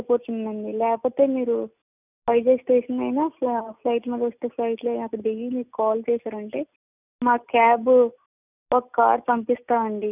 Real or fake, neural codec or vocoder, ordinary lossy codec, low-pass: real; none; none; 3.6 kHz